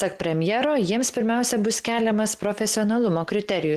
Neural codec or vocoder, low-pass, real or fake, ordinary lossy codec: none; 14.4 kHz; real; Opus, 16 kbps